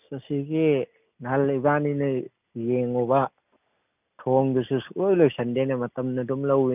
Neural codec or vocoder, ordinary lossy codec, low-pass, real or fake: none; none; 3.6 kHz; real